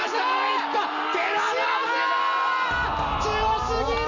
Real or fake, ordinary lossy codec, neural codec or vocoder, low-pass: real; none; none; 7.2 kHz